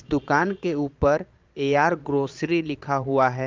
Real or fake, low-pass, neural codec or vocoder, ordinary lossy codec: fake; 7.2 kHz; vocoder, 22.05 kHz, 80 mel bands, Vocos; Opus, 24 kbps